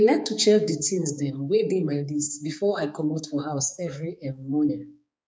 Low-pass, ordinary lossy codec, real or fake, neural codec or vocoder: none; none; fake; codec, 16 kHz, 4 kbps, X-Codec, HuBERT features, trained on balanced general audio